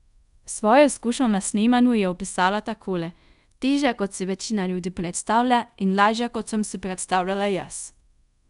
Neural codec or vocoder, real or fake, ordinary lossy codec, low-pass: codec, 24 kHz, 0.5 kbps, DualCodec; fake; none; 10.8 kHz